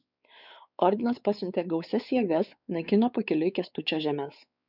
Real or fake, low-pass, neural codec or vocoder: fake; 5.4 kHz; codec, 16 kHz, 4 kbps, X-Codec, WavLM features, trained on Multilingual LibriSpeech